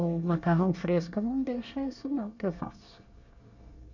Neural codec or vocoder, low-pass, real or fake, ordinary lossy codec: codec, 24 kHz, 1 kbps, SNAC; 7.2 kHz; fake; none